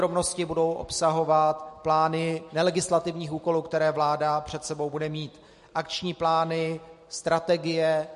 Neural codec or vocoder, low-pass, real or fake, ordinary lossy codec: none; 14.4 kHz; real; MP3, 48 kbps